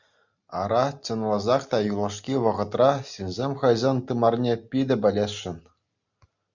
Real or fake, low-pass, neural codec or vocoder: real; 7.2 kHz; none